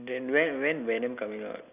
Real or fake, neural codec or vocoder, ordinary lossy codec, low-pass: real; none; none; 3.6 kHz